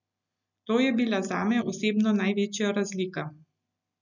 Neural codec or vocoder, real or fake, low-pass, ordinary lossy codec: none; real; 7.2 kHz; none